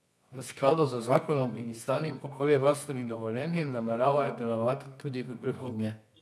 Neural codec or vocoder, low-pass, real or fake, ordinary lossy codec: codec, 24 kHz, 0.9 kbps, WavTokenizer, medium music audio release; none; fake; none